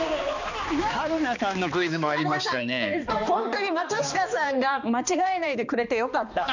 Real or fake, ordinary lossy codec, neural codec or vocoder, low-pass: fake; none; codec, 16 kHz, 2 kbps, X-Codec, HuBERT features, trained on balanced general audio; 7.2 kHz